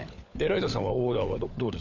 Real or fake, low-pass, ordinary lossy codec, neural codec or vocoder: fake; 7.2 kHz; none; codec, 16 kHz, 16 kbps, FunCodec, trained on LibriTTS, 50 frames a second